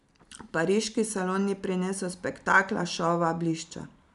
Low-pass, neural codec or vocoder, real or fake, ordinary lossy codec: 10.8 kHz; none; real; none